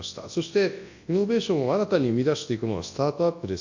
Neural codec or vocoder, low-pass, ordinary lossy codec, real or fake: codec, 24 kHz, 0.9 kbps, WavTokenizer, large speech release; 7.2 kHz; AAC, 48 kbps; fake